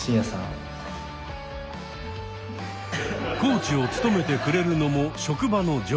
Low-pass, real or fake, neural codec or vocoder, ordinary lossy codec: none; real; none; none